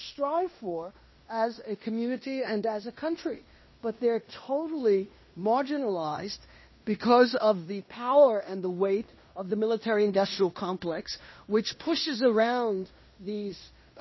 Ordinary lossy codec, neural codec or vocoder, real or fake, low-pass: MP3, 24 kbps; codec, 16 kHz in and 24 kHz out, 0.9 kbps, LongCat-Audio-Codec, fine tuned four codebook decoder; fake; 7.2 kHz